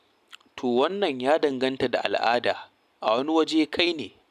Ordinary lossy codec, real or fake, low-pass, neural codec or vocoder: none; real; 14.4 kHz; none